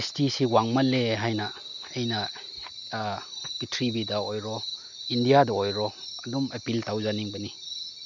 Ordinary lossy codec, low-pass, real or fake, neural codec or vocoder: none; 7.2 kHz; real; none